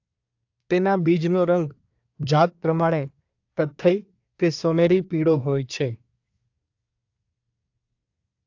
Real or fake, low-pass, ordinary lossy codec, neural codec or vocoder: fake; 7.2 kHz; AAC, 48 kbps; codec, 24 kHz, 1 kbps, SNAC